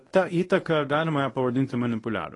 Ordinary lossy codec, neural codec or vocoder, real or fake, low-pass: AAC, 32 kbps; codec, 24 kHz, 0.9 kbps, WavTokenizer, medium speech release version 1; fake; 10.8 kHz